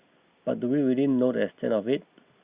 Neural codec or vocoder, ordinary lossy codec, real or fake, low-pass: none; Opus, 64 kbps; real; 3.6 kHz